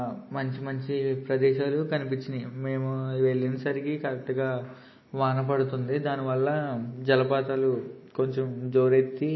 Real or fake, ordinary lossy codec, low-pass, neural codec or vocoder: real; MP3, 24 kbps; 7.2 kHz; none